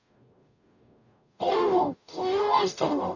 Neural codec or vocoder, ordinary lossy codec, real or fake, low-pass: codec, 44.1 kHz, 0.9 kbps, DAC; none; fake; 7.2 kHz